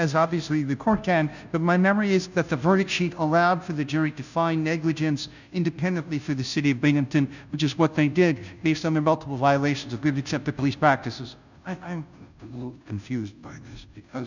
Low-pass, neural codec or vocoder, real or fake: 7.2 kHz; codec, 16 kHz, 0.5 kbps, FunCodec, trained on Chinese and English, 25 frames a second; fake